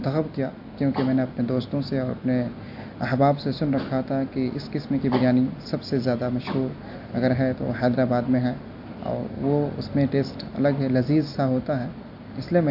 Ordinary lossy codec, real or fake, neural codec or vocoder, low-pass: AAC, 48 kbps; real; none; 5.4 kHz